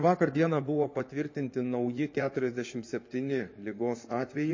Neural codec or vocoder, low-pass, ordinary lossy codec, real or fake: codec, 16 kHz in and 24 kHz out, 2.2 kbps, FireRedTTS-2 codec; 7.2 kHz; MP3, 32 kbps; fake